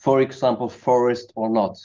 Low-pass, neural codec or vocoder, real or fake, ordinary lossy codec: 7.2 kHz; none; real; Opus, 24 kbps